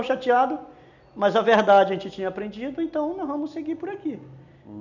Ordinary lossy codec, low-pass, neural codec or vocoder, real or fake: none; 7.2 kHz; none; real